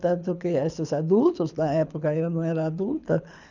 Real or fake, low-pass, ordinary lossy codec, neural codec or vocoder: fake; 7.2 kHz; none; codec, 24 kHz, 6 kbps, HILCodec